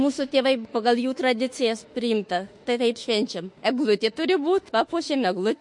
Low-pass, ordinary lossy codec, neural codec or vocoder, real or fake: 10.8 kHz; MP3, 48 kbps; autoencoder, 48 kHz, 32 numbers a frame, DAC-VAE, trained on Japanese speech; fake